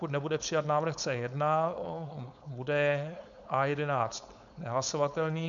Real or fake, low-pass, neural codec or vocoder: fake; 7.2 kHz; codec, 16 kHz, 4.8 kbps, FACodec